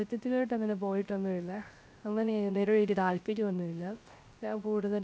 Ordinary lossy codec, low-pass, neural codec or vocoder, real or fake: none; none; codec, 16 kHz, 0.3 kbps, FocalCodec; fake